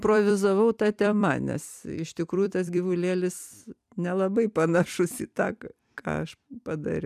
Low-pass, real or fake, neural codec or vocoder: 14.4 kHz; fake; vocoder, 44.1 kHz, 128 mel bands every 256 samples, BigVGAN v2